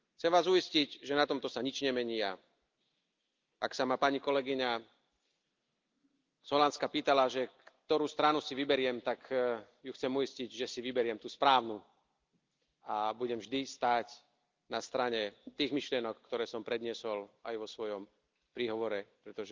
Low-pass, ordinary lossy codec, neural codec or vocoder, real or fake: 7.2 kHz; Opus, 24 kbps; none; real